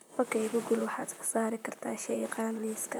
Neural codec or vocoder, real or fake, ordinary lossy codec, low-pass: vocoder, 44.1 kHz, 128 mel bands, Pupu-Vocoder; fake; none; none